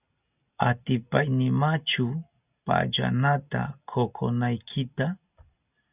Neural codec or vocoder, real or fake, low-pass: none; real; 3.6 kHz